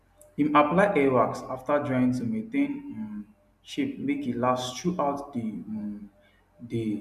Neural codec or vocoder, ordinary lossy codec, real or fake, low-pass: none; MP3, 96 kbps; real; 14.4 kHz